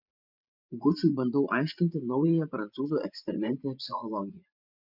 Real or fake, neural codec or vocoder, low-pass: fake; vocoder, 24 kHz, 100 mel bands, Vocos; 5.4 kHz